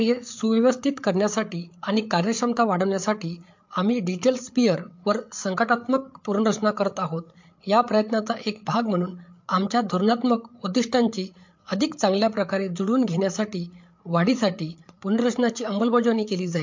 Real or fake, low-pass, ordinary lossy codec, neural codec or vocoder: fake; 7.2 kHz; MP3, 48 kbps; codec, 16 kHz, 8 kbps, FreqCodec, larger model